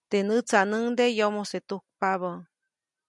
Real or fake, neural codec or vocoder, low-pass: real; none; 10.8 kHz